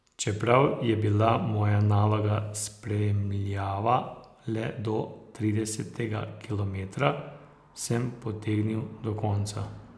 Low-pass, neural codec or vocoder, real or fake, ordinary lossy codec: none; none; real; none